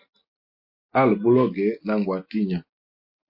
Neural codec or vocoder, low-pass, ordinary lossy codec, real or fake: none; 5.4 kHz; MP3, 32 kbps; real